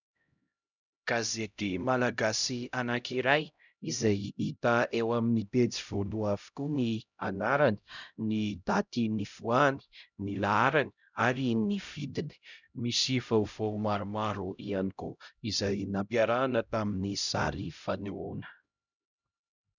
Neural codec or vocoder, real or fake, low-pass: codec, 16 kHz, 0.5 kbps, X-Codec, HuBERT features, trained on LibriSpeech; fake; 7.2 kHz